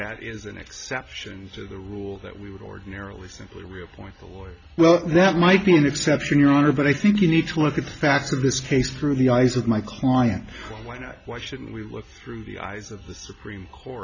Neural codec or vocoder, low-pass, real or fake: none; 7.2 kHz; real